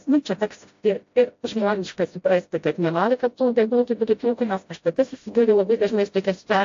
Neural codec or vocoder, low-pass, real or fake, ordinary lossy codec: codec, 16 kHz, 0.5 kbps, FreqCodec, smaller model; 7.2 kHz; fake; AAC, 48 kbps